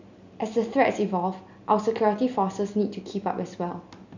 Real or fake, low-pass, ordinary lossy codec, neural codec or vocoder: real; 7.2 kHz; none; none